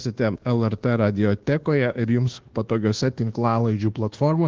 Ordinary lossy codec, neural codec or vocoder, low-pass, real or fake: Opus, 16 kbps; codec, 24 kHz, 1.2 kbps, DualCodec; 7.2 kHz; fake